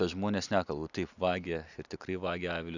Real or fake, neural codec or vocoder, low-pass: real; none; 7.2 kHz